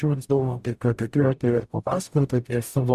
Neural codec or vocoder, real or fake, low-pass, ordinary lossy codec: codec, 44.1 kHz, 0.9 kbps, DAC; fake; 14.4 kHz; Opus, 64 kbps